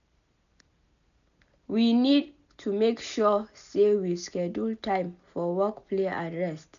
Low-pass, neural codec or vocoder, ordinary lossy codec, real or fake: 7.2 kHz; none; none; real